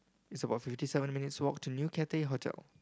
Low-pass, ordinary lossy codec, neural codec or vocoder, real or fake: none; none; none; real